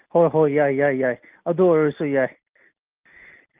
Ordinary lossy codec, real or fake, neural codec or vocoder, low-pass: Opus, 32 kbps; real; none; 3.6 kHz